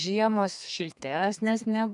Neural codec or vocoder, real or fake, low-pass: codec, 32 kHz, 1.9 kbps, SNAC; fake; 10.8 kHz